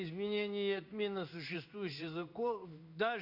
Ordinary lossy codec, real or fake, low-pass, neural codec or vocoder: none; fake; 5.4 kHz; codec, 16 kHz in and 24 kHz out, 1 kbps, XY-Tokenizer